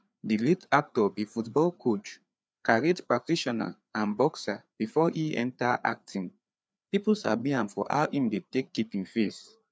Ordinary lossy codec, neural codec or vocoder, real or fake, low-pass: none; codec, 16 kHz, 4 kbps, FreqCodec, larger model; fake; none